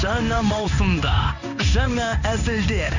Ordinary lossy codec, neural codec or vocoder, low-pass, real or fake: none; codec, 16 kHz in and 24 kHz out, 1 kbps, XY-Tokenizer; 7.2 kHz; fake